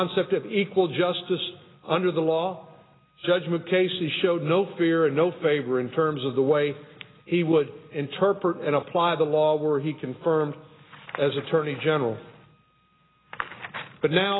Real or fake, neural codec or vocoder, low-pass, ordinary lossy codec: real; none; 7.2 kHz; AAC, 16 kbps